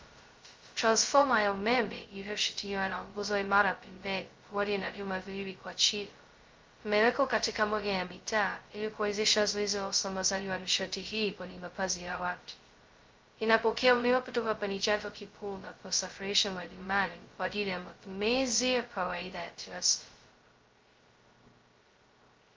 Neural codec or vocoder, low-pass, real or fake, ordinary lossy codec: codec, 16 kHz, 0.2 kbps, FocalCodec; 7.2 kHz; fake; Opus, 32 kbps